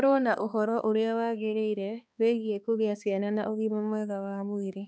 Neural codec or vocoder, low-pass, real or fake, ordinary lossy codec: codec, 16 kHz, 2 kbps, X-Codec, HuBERT features, trained on balanced general audio; none; fake; none